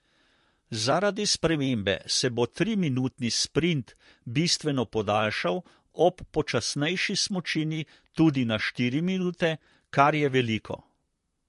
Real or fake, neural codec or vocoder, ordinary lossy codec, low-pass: fake; vocoder, 44.1 kHz, 128 mel bands, Pupu-Vocoder; MP3, 48 kbps; 14.4 kHz